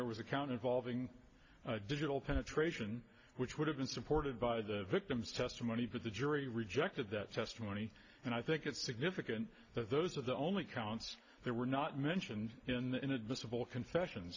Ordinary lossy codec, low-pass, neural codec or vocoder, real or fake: AAC, 48 kbps; 7.2 kHz; none; real